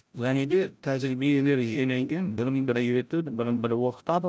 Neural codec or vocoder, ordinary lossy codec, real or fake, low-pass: codec, 16 kHz, 0.5 kbps, FreqCodec, larger model; none; fake; none